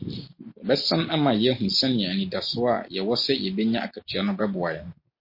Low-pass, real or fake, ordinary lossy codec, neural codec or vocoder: 5.4 kHz; real; MP3, 32 kbps; none